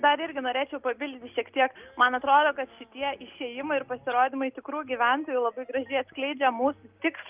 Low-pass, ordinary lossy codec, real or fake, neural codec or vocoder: 3.6 kHz; Opus, 32 kbps; real; none